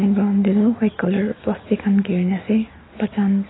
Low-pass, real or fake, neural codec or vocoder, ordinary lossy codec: 7.2 kHz; real; none; AAC, 16 kbps